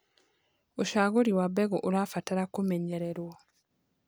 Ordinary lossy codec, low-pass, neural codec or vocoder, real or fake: none; none; none; real